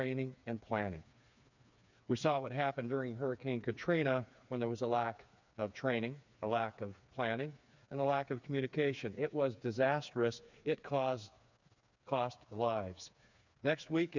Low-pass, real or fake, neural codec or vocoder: 7.2 kHz; fake; codec, 16 kHz, 4 kbps, FreqCodec, smaller model